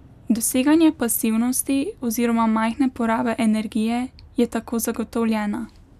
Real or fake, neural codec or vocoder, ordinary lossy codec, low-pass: real; none; none; 14.4 kHz